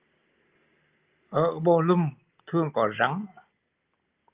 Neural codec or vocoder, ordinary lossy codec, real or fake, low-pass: none; Opus, 24 kbps; real; 3.6 kHz